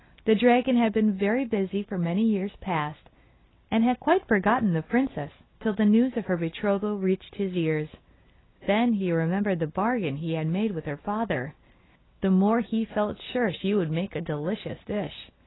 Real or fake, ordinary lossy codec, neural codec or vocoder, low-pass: real; AAC, 16 kbps; none; 7.2 kHz